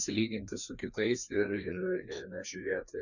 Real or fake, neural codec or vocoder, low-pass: fake; codec, 16 kHz, 2 kbps, FreqCodec, larger model; 7.2 kHz